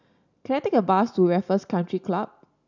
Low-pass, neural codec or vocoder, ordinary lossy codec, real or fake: 7.2 kHz; none; none; real